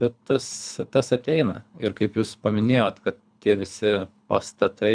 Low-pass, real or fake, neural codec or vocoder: 9.9 kHz; fake; codec, 24 kHz, 3 kbps, HILCodec